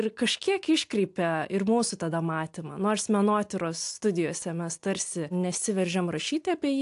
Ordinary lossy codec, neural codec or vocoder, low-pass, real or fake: AAC, 64 kbps; none; 10.8 kHz; real